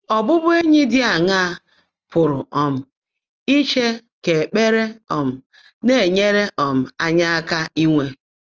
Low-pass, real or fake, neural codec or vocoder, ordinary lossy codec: 7.2 kHz; real; none; Opus, 24 kbps